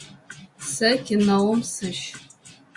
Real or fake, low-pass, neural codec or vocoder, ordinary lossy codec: real; 10.8 kHz; none; Opus, 64 kbps